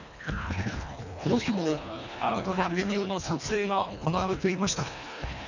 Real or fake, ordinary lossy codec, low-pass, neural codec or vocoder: fake; none; 7.2 kHz; codec, 24 kHz, 1.5 kbps, HILCodec